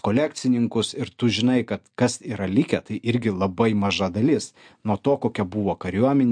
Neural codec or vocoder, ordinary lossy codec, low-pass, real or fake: none; MP3, 64 kbps; 9.9 kHz; real